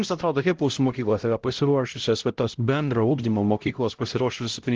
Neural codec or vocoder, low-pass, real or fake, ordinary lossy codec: codec, 16 kHz, 0.5 kbps, X-Codec, HuBERT features, trained on LibriSpeech; 7.2 kHz; fake; Opus, 16 kbps